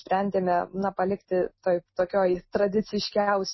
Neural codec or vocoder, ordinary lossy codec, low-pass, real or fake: none; MP3, 24 kbps; 7.2 kHz; real